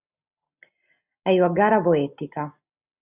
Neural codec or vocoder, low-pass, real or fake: none; 3.6 kHz; real